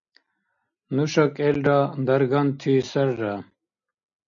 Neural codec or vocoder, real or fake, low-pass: none; real; 7.2 kHz